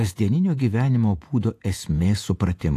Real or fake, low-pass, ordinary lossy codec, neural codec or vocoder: fake; 14.4 kHz; AAC, 64 kbps; vocoder, 48 kHz, 128 mel bands, Vocos